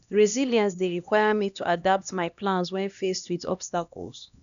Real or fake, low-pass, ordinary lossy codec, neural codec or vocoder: fake; 7.2 kHz; none; codec, 16 kHz, 1 kbps, X-Codec, HuBERT features, trained on LibriSpeech